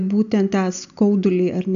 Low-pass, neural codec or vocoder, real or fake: 7.2 kHz; none; real